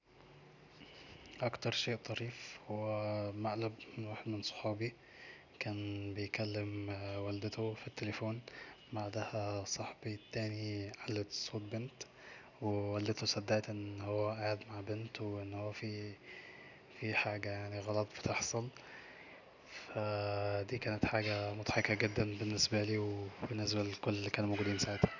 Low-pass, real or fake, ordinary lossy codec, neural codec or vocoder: 7.2 kHz; real; none; none